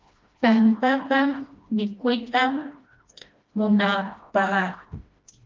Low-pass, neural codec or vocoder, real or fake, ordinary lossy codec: 7.2 kHz; codec, 16 kHz, 1 kbps, FreqCodec, smaller model; fake; Opus, 24 kbps